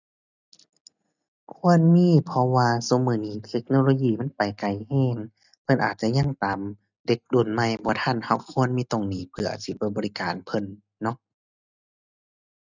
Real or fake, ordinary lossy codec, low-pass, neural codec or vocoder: real; none; 7.2 kHz; none